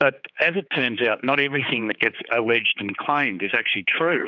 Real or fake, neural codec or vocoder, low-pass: fake; codec, 16 kHz, 4 kbps, X-Codec, HuBERT features, trained on general audio; 7.2 kHz